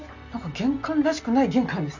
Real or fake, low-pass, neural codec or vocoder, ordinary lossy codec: real; 7.2 kHz; none; none